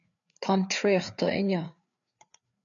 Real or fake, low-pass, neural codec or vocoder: fake; 7.2 kHz; codec, 16 kHz, 4 kbps, FreqCodec, larger model